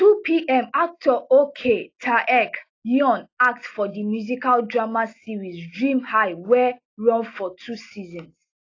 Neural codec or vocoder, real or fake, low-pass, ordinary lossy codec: none; real; 7.2 kHz; AAC, 32 kbps